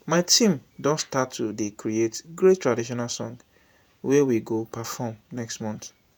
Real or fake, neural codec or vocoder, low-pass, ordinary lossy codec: fake; vocoder, 48 kHz, 128 mel bands, Vocos; none; none